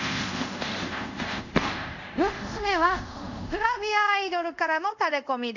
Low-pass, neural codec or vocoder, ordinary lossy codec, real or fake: 7.2 kHz; codec, 24 kHz, 0.5 kbps, DualCodec; none; fake